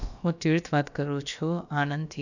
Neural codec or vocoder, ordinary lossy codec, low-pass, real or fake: codec, 16 kHz, about 1 kbps, DyCAST, with the encoder's durations; none; 7.2 kHz; fake